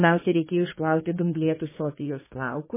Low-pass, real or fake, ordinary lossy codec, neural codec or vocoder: 3.6 kHz; fake; MP3, 16 kbps; codec, 32 kHz, 1.9 kbps, SNAC